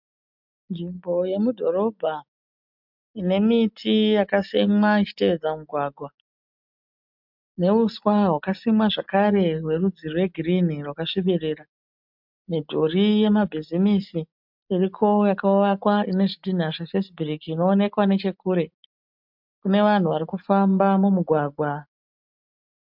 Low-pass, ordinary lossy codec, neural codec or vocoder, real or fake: 5.4 kHz; MP3, 48 kbps; none; real